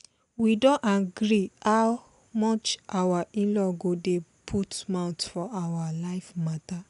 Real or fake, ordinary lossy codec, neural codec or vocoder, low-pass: real; none; none; 10.8 kHz